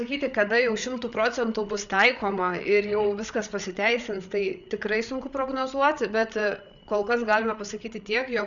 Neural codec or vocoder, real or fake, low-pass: codec, 16 kHz, 8 kbps, FreqCodec, larger model; fake; 7.2 kHz